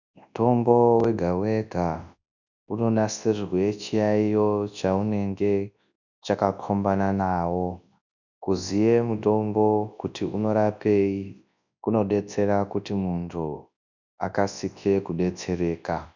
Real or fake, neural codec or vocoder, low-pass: fake; codec, 24 kHz, 0.9 kbps, WavTokenizer, large speech release; 7.2 kHz